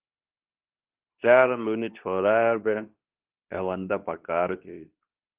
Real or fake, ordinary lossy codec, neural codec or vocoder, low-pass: fake; Opus, 32 kbps; codec, 24 kHz, 0.9 kbps, WavTokenizer, medium speech release version 2; 3.6 kHz